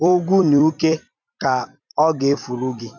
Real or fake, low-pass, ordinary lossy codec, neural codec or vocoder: fake; 7.2 kHz; none; vocoder, 44.1 kHz, 128 mel bands every 256 samples, BigVGAN v2